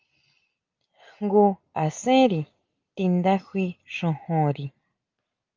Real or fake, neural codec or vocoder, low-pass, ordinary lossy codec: real; none; 7.2 kHz; Opus, 24 kbps